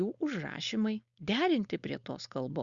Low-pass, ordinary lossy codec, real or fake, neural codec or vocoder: 7.2 kHz; Opus, 64 kbps; fake; codec, 16 kHz, 4.8 kbps, FACodec